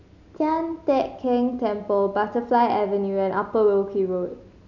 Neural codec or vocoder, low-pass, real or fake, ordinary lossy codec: none; 7.2 kHz; real; none